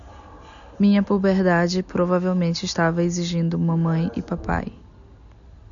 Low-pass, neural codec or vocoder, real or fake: 7.2 kHz; none; real